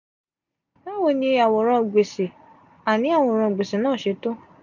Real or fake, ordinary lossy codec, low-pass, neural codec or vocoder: real; none; 7.2 kHz; none